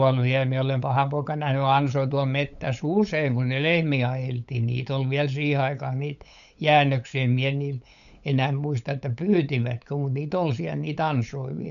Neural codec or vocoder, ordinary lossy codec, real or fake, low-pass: codec, 16 kHz, 8 kbps, FunCodec, trained on LibriTTS, 25 frames a second; none; fake; 7.2 kHz